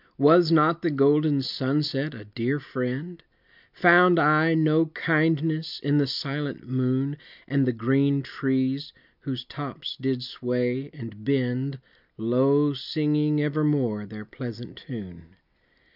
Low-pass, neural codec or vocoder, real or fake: 5.4 kHz; none; real